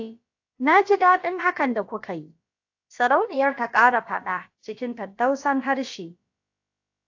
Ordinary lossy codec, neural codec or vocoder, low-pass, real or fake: AAC, 48 kbps; codec, 16 kHz, about 1 kbps, DyCAST, with the encoder's durations; 7.2 kHz; fake